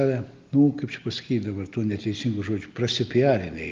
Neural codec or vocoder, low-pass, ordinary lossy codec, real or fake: none; 7.2 kHz; Opus, 32 kbps; real